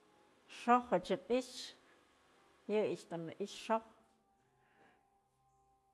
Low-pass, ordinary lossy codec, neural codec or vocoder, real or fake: none; none; none; real